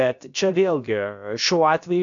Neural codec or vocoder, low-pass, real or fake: codec, 16 kHz, about 1 kbps, DyCAST, with the encoder's durations; 7.2 kHz; fake